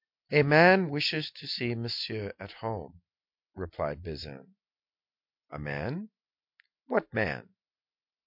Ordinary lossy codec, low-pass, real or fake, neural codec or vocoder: MP3, 48 kbps; 5.4 kHz; real; none